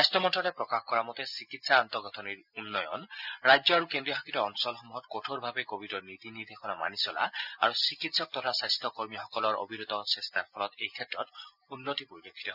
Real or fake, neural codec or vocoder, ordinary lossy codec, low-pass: real; none; none; 5.4 kHz